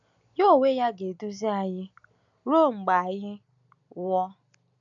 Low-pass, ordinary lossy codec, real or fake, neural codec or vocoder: 7.2 kHz; none; real; none